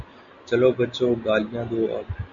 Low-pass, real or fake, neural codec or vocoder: 7.2 kHz; real; none